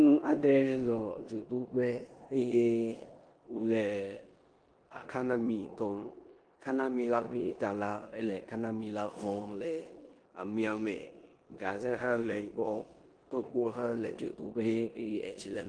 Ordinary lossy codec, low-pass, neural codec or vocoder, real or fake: Opus, 24 kbps; 9.9 kHz; codec, 16 kHz in and 24 kHz out, 0.9 kbps, LongCat-Audio-Codec, four codebook decoder; fake